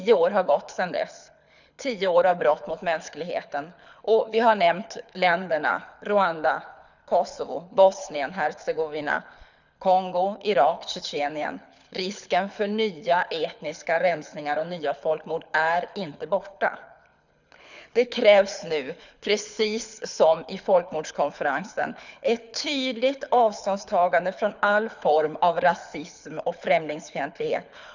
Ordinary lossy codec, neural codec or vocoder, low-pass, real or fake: none; codec, 24 kHz, 6 kbps, HILCodec; 7.2 kHz; fake